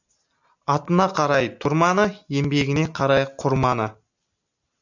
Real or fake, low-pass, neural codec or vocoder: real; 7.2 kHz; none